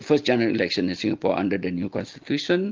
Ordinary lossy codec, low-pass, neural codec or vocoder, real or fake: Opus, 32 kbps; 7.2 kHz; none; real